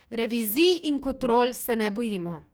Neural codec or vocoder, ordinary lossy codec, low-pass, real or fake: codec, 44.1 kHz, 2.6 kbps, DAC; none; none; fake